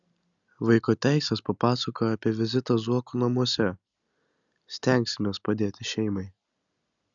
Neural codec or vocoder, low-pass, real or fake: none; 7.2 kHz; real